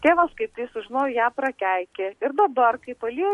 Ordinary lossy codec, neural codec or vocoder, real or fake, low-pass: MP3, 48 kbps; none; real; 14.4 kHz